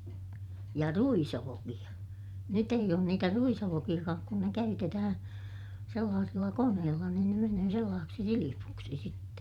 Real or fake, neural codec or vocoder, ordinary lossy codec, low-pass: fake; vocoder, 44.1 kHz, 128 mel bands every 512 samples, BigVGAN v2; none; 19.8 kHz